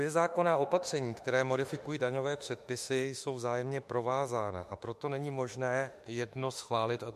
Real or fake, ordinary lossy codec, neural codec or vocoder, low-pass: fake; MP3, 64 kbps; autoencoder, 48 kHz, 32 numbers a frame, DAC-VAE, trained on Japanese speech; 14.4 kHz